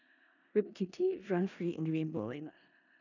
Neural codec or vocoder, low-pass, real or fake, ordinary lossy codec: codec, 16 kHz in and 24 kHz out, 0.4 kbps, LongCat-Audio-Codec, four codebook decoder; 7.2 kHz; fake; none